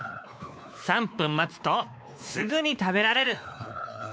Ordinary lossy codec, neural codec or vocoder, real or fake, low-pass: none; codec, 16 kHz, 2 kbps, X-Codec, WavLM features, trained on Multilingual LibriSpeech; fake; none